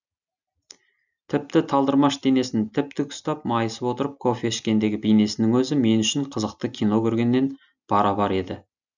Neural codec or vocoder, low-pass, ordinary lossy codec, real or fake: none; 7.2 kHz; none; real